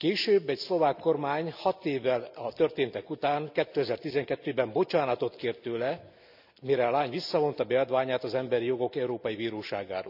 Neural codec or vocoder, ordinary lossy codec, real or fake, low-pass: none; none; real; 5.4 kHz